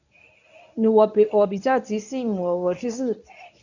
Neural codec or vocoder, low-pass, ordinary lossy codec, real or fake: codec, 24 kHz, 0.9 kbps, WavTokenizer, medium speech release version 1; 7.2 kHz; none; fake